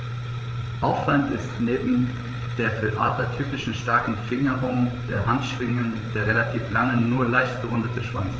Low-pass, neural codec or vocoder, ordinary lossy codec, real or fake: none; codec, 16 kHz, 8 kbps, FreqCodec, larger model; none; fake